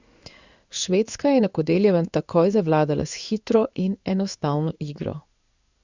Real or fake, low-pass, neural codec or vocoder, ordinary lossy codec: fake; 7.2 kHz; codec, 16 kHz in and 24 kHz out, 1 kbps, XY-Tokenizer; Opus, 64 kbps